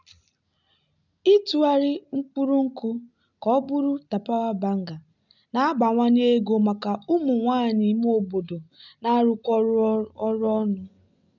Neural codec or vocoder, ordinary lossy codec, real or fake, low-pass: none; none; real; 7.2 kHz